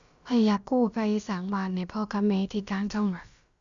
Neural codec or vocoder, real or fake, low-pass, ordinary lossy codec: codec, 16 kHz, about 1 kbps, DyCAST, with the encoder's durations; fake; 7.2 kHz; Opus, 64 kbps